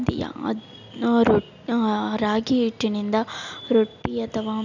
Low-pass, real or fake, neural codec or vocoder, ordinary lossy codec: 7.2 kHz; real; none; none